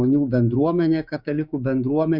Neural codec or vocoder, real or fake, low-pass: codec, 16 kHz, 6 kbps, DAC; fake; 5.4 kHz